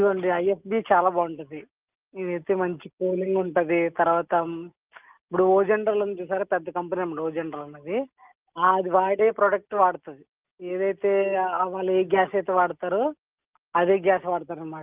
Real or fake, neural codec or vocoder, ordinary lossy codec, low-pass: real; none; Opus, 32 kbps; 3.6 kHz